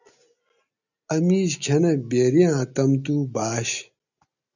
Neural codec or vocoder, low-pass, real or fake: none; 7.2 kHz; real